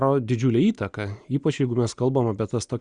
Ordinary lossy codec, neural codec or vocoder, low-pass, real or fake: Opus, 64 kbps; none; 9.9 kHz; real